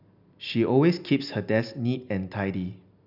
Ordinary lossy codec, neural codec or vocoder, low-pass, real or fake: none; none; 5.4 kHz; real